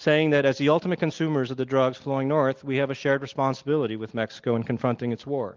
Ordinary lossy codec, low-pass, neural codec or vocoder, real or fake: Opus, 32 kbps; 7.2 kHz; none; real